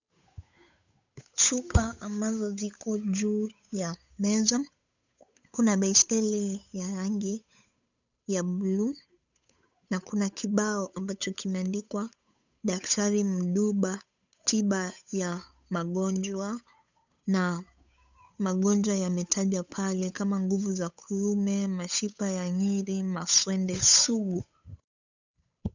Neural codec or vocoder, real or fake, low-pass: codec, 16 kHz, 8 kbps, FunCodec, trained on Chinese and English, 25 frames a second; fake; 7.2 kHz